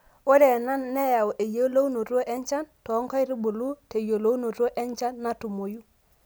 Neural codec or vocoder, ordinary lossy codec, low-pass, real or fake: none; none; none; real